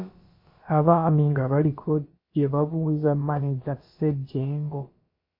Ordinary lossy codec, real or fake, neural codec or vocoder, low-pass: MP3, 24 kbps; fake; codec, 16 kHz, about 1 kbps, DyCAST, with the encoder's durations; 5.4 kHz